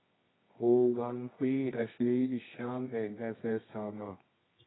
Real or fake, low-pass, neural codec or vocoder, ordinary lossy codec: fake; 7.2 kHz; codec, 24 kHz, 0.9 kbps, WavTokenizer, medium music audio release; AAC, 16 kbps